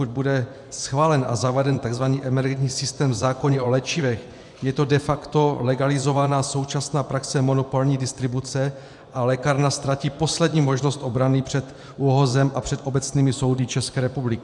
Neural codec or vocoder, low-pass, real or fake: vocoder, 24 kHz, 100 mel bands, Vocos; 10.8 kHz; fake